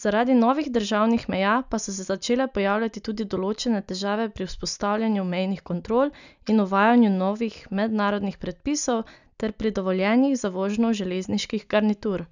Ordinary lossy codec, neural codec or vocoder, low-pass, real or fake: none; none; 7.2 kHz; real